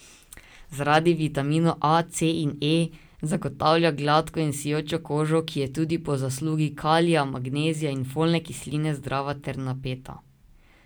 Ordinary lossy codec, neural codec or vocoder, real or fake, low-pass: none; none; real; none